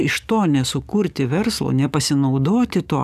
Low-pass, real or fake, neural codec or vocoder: 14.4 kHz; fake; codec, 44.1 kHz, 7.8 kbps, DAC